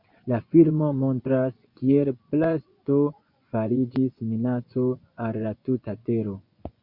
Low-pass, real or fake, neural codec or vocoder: 5.4 kHz; fake; vocoder, 24 kHz, 100 mel bands, Vocos